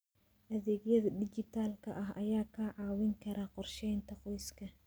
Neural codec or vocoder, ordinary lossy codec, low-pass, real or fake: none; none; none; real